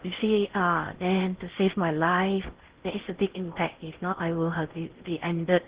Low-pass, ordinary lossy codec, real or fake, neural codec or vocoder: 3.6 kHz; Opus, 16 kbps; fake; codec, 16 kHz in and 24 kHz out, 0.6 kbps, FocalCodec, streaming, 4096 codes